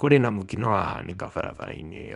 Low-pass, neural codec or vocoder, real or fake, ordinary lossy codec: 10.8 kHz; codec, 24 kHz, 0.9 kbps, WavTokenizer, small release; fake; none